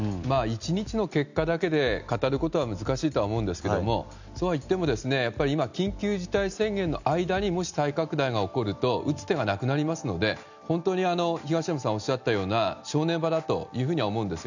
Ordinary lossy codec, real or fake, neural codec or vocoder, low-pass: none; real; none; 7.2 kHz